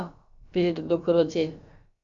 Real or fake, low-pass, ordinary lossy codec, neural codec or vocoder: fake; 7.2 kHz; Opus, 64 kbps; codec, 16 kHz, about 1 kbps, DyCAST, with the encoder's durations